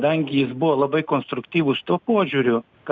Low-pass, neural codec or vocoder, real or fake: 7.2 kHz; none; real